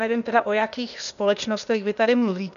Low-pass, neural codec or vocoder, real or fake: 7.2 kHz; codec, 16 kHz, 0.8 kbps, ZipCodec; fake